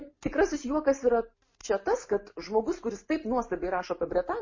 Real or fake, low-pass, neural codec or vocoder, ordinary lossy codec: real; 7.2 kHz; none; MP3, 32 kbps